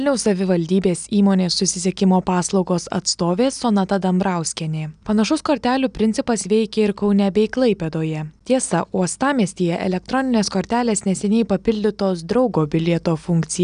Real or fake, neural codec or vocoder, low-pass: real; none; 9.9 kHz